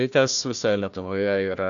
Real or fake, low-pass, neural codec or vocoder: fake; 7.2 kHz; codec, 16 kHz, 1 kbps, FunCodec, trained on Chinese and English, 50 frames a second